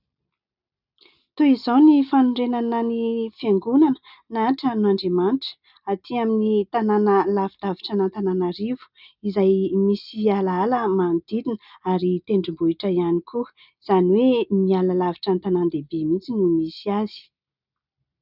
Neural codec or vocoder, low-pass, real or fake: none; 5.4 kHz; real